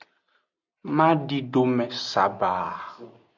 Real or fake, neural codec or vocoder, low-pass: real; none; 7.2 kHz